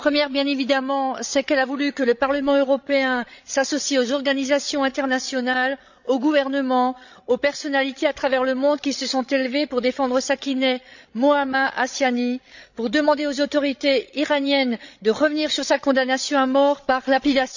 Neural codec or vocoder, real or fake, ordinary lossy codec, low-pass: codec, 16 kHz, 16 kbps, FreqCodec, larger model; fake; none; 7.2 kHz